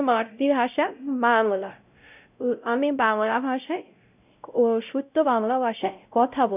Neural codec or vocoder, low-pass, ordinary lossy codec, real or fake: codec, 16 kHz, 0.5 kbps, X-Codec, WavLM features, trained on Multilingual LibriSpeech; 3.6 kHz; none; fake